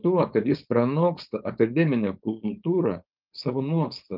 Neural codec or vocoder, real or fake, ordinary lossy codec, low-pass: codec, 16 kHz, 4.8 kbps, FACodec; fake; Opus, 24 kbps; 5.4 kHz